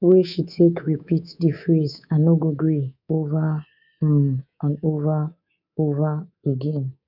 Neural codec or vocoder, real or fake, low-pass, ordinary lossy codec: codec, 24 kHz, 3.1 kbps, DualCodec; fake; 5.4 kHz; none